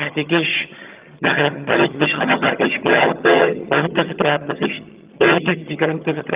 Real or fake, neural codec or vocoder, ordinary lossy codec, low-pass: fake; vocoder, 22.05 kHz, 80 mel bands, HiFi-GAN; Opus, 32 kbps; 3.6 kHz